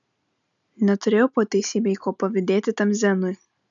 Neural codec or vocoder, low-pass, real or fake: none; 7.2 kHz; real